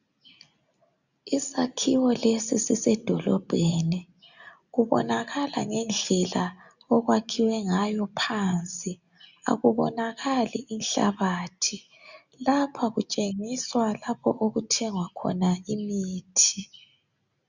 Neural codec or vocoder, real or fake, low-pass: none; real; 7.2 kHz